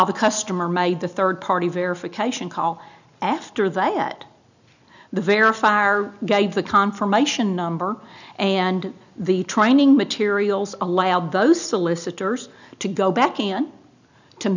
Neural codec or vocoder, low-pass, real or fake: none; 7.2 kHz; real